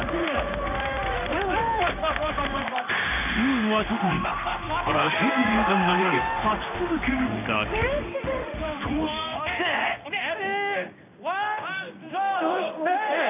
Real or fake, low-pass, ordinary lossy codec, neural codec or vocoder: fake; 3.6 kHz; none; codec, 16 kHz in and 24 kHz out, 1 kbps, XY-Tokenizer